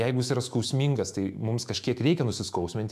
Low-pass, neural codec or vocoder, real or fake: 14.4 kHz; autoencoder, 48 kHz, 128 numbers a frame, DAC-VAE, trained on Japanese speech; fake